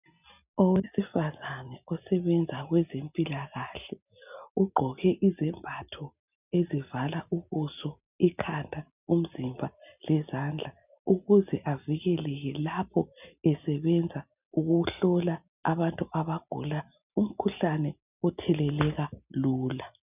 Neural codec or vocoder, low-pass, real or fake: none; 3.6 kHz; real